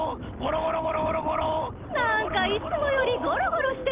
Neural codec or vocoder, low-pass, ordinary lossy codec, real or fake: none; 3.6 kHz; Opus, 32 kbps; real